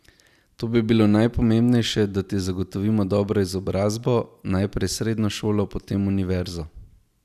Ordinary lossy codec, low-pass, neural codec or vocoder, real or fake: none; 14.4 kHz; none; real